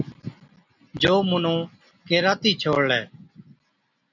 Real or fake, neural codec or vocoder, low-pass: real; none; 7.2 kHz